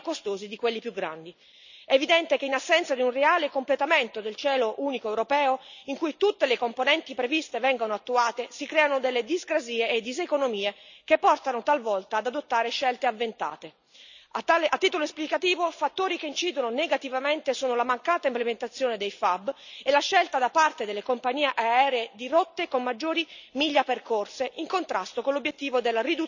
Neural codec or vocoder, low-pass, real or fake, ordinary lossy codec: none; 7.2 kHz; real; none